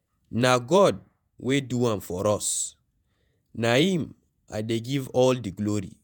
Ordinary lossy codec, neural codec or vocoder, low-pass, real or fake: none; vocoder, 48 kHz, 128 mel bands, Vocos; none; fake